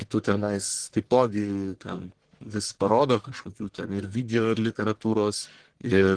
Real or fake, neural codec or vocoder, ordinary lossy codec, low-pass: fake; codec, 44.1 kHz, 1.7 kbps, Pupu-Codec; Opus, 16 kbps; 9.9 kHz